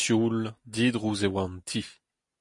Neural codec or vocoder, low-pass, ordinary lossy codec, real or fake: none; 10.8 kHz; MP3, 48 kbps; real